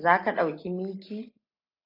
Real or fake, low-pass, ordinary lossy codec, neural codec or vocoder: real; 5.4 kHz; AAC, 32 kbps; none